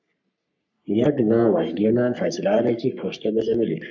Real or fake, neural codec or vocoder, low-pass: fake; codec, 44.1 kHz, 3.4 kbps, Pupu-Codec; 7.2 kHz